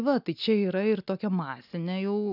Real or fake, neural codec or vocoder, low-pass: real; none; 5.4 kHz